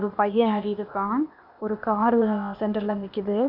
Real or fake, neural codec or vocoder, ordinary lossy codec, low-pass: fake; codec, 16 kHz, 0.8 kbps, ZipCodec; none; 5.4 kHz